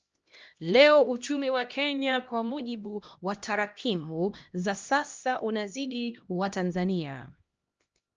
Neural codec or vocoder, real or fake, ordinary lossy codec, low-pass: codec, 16 kHz, 1 kbps, X-Codec, HuBERT features, trained on LibriSpeech; fake; Opus, 24 kbps; 7.2 kHz